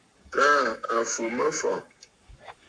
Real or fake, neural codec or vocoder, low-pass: fake; codec, 44.1 kHz, 7.8 kbps, Pupu-Codec; 9.9 kHz